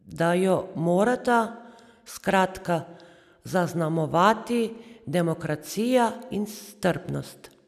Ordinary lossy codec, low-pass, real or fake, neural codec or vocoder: none; 14.4 kHz; real; none